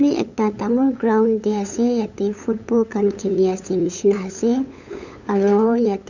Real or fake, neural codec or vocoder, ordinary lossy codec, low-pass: fake; codec, 16 kHz, 8 kbps, FunCodec, trained on LibriTTS, 25 frames a second; none; 7.2 kHz